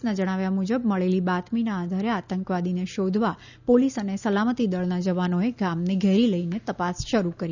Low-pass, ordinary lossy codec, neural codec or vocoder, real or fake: 7.2 kHz; none; none; real